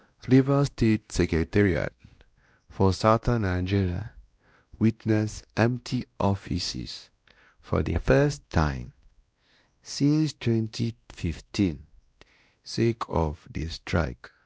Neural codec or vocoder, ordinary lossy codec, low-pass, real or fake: codec, 16 kHz, 1 kbps, X-Codec, WavLM features, trained on Multilingual LibriSpeech; none; none; fake